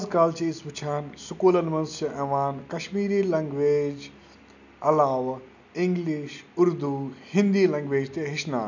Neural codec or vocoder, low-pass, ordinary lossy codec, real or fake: none; 7.2 kHz; none; real